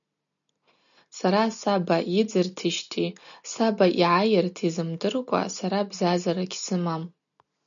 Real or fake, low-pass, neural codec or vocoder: real; 7.2 kHz; none